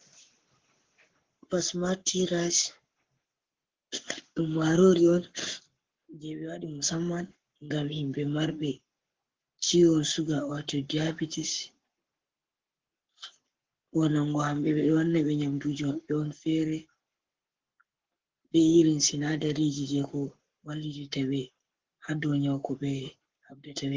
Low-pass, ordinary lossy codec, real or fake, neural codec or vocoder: 7.2 kHz; Opus, 16 kbps; fake; codec, 44.1 kHz, 7.8 kbps, Pupu-Codec